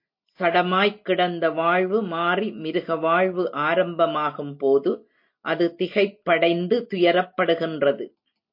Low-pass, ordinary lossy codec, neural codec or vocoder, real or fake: 5.4 kHz; MP3, 32 kbps; none; real